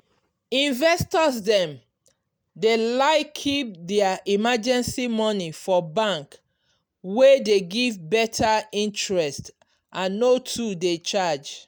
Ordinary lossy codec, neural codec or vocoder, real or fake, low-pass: none; none; real; none